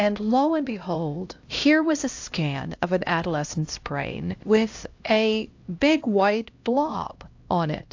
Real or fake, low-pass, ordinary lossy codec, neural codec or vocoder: fake; 7.2 kHz; AAC, 48 kbps; codec, 16 kHz, 1 kbps, X-Codec, HuBERT features, trained on LibriSpeech